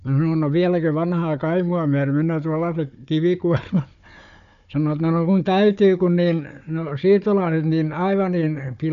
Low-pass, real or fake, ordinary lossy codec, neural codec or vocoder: 7.2 kHz; fake; none; codec, 16 kHz, 4 kbps, FunCodec, trained on Chinese and English, 50 frames a second